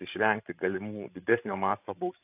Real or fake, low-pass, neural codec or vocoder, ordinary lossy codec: fake; 3.6 kHz; codec, 16 kHz, 16 kbps, FreqCodec, larger model; AAC, 32 kbps